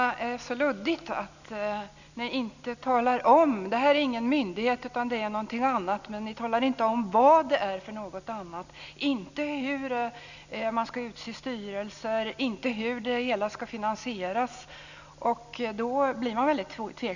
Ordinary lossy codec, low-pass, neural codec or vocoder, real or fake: none; 7.2 kHz; none; real